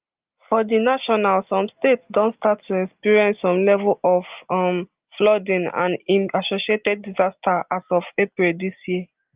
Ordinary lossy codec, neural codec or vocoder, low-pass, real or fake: Opus, 24 kbps; none; 3.6 kHz; real